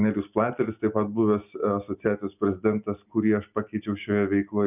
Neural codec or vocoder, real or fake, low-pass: none; real; 3.6 kHz